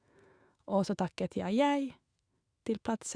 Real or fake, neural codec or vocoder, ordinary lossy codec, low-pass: fake; autoencoder, 48 kHz, 128 numbers a frame, DAC-VAE, trained on Japanese speech; Opus, 64 kbps; 9.9 kHz